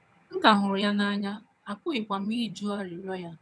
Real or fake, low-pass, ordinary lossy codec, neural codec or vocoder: fake; none; none; vocoder, 22.05 kHz, 80 mel bands, HiFi-GAN